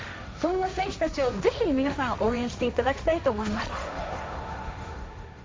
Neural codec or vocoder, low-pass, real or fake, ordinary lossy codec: codec, 16 kHz, 1.1 kbps, Voila-Tokenizer; none; fake; none